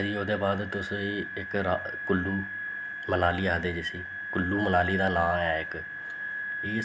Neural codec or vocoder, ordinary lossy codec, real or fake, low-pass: none; none; real; none